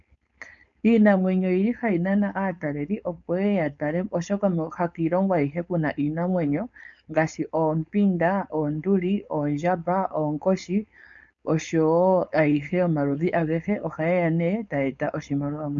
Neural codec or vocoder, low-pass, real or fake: codec, 16 kHz, 4.8 kbps, FACodec; 7.2 kHz; fake